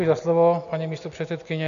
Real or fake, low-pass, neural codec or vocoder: real; 7.2 kHz; none